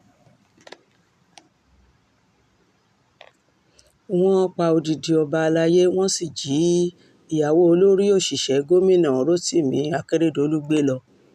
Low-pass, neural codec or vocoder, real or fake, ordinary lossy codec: 14.4 kHz; none; real; none